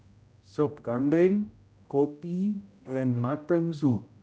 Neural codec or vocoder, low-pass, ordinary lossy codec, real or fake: codec, 16 kHz, 0.5 kbps, X-Codec, HuBERT features, trained on general audio; none; none; fake